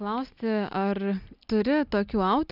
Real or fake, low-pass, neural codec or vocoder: real; 5.4 kHz; none